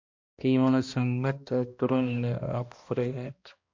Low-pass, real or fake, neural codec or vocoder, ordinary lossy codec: 7.2 kHz; fake; codec, 16 kHz, 1 kbps, X-Codec, HuBERT features, trained on balanced general audio; MP3, 48 kbps